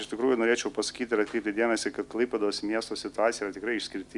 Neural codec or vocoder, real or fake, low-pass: none; real; 10.8 kHz